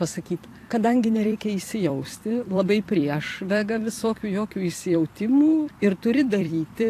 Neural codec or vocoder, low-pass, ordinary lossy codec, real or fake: vocoder, 44.1 kHz, 128 mel bands every 256 samples, BigVGAN v2; 14.4 kHz; AAC, 64 kbps; fake